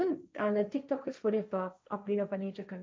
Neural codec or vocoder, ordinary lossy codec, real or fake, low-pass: codec, 16 kHz, 1.1 kbps, Voila-Tokenizer; none; fake; none